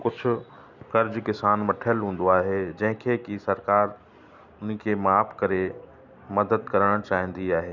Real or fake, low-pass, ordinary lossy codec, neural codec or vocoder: real; 7.2 kHz; none; none